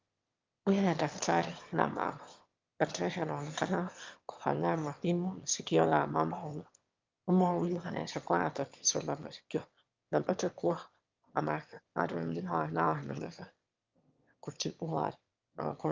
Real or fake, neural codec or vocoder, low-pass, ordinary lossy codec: fake; autoencoder, 22.05 kHz, a latent of 192 numbers a frame, VITS, trained on one speaker; 7.2 kHz; Opus, 24 kbps